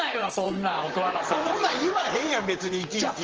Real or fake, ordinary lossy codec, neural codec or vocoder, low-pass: fake; Opus, 16 kbps; vocoder, 44.1 kHz, 128 mel bands, Pupu-Vocoder; 7.2 kHz